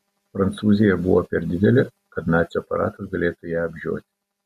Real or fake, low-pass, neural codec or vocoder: real; 14.4 kHz; none